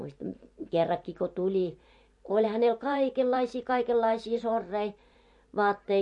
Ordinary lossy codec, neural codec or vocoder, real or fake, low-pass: MP3, 48 kbps; vocoder, 44.1 kHz, 128 mel bands every 512 samples, BigVGAN v2; fake; 10.8 kHz